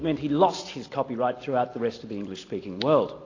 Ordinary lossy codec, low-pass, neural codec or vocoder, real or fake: AAC, 32 kbps; 7.2 kHz; none; real